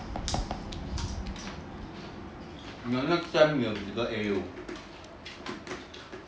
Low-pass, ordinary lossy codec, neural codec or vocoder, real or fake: none; none; none; real